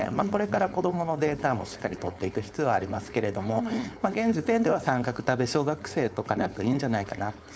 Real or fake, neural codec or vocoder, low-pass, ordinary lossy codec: fake; codec, 16 kHz, 4.8 kbps, FACodec; none; none